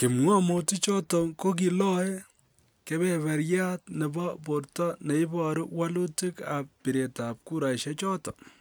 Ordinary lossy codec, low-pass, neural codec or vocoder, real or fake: none; none; none; real